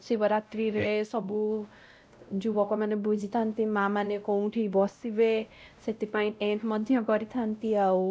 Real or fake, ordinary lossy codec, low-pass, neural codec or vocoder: fake; none; none; codec, 16 kHz, 0.5 kbps, X-Codec, WavLM features, trained on Multilingual LibriSpeech